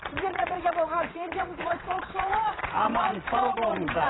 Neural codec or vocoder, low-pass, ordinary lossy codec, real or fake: vocoder, 22.05 kHz, 80 mel bands, WaveNeXt; 7.2 kHz; AAC, 16 kbps; fake